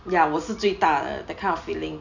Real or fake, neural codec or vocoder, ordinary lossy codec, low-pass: real; none; none; 7.2 kHz